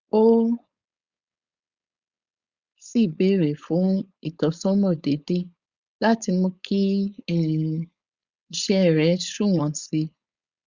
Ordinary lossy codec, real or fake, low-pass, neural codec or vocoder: Opus, 64 kbps; fake; 7.2 kHz; codec, 16 kHz, 4.8 kbps, FACodec